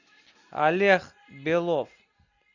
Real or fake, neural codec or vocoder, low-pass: real; none; 7.2 kHz